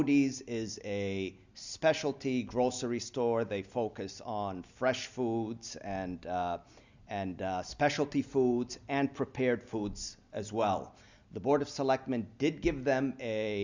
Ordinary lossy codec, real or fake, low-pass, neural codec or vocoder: Opus, 64 kbps; real; 7.2 kHz; none